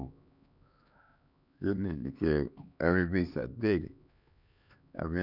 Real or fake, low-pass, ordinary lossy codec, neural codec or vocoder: fake; 5.4 kHz; none; codec, 16 kHz, 2 kbps, X-Codec, HuBERT features, trained on LibriSpeech